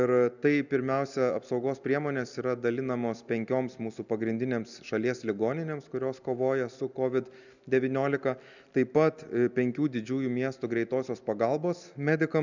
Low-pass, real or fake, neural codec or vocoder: 7.2 kHz; real; none